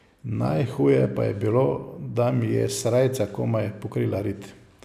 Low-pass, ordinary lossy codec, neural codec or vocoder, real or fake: 14.4 kHz; none; none; real